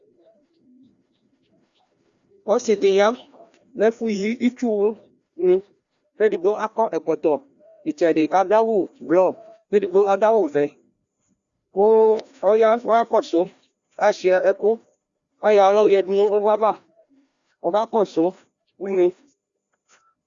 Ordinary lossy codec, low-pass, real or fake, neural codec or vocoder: Opus, 64 kbps; 7.2 kHz; fake; codec, 16 kHz, 1 kbps, FreqCodec, larger model